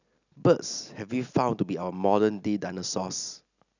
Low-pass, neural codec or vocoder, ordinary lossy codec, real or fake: 7.2 kHz; none; none; real